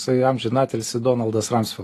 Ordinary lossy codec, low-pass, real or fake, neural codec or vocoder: AAC, 48 kbps; 14.4 kHz; real; none